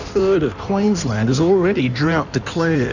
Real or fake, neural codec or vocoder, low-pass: fake; codec, 16 kHz in and 24 kHz out, 1.1 kbps, FireRedTTS-2 codec; 7.2 kHz